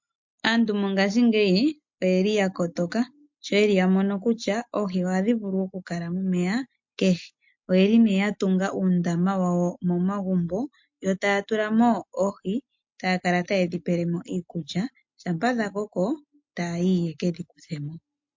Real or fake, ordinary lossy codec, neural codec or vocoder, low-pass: real; MP3, 48 kbps; none; 7.2 kHz